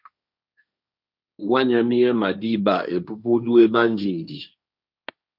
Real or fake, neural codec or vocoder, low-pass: fake; codec, 16 kHz, 1.1 kbps, Voila-Tokenizer; 5.4 kHz